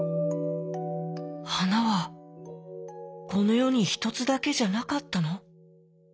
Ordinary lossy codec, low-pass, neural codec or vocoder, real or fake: none; none; none; real